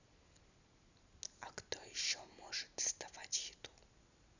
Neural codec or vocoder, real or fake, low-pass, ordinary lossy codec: none; real; 7.2 kHz; none